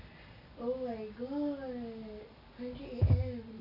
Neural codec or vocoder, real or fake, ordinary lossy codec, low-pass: none; real; AAC, 32 kbps; 5.4 kHz